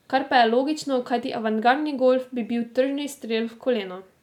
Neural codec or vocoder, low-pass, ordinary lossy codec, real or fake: none; 19.8 kHz; none; real